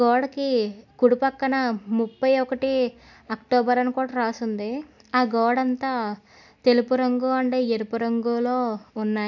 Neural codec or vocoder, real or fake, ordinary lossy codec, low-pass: none; real; none; 7.2 kHz